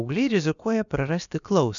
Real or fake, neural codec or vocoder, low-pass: fake; codec, 16 kHz, about 1 kbps, DyCAST, with the encoder's durations; 7.2 kHz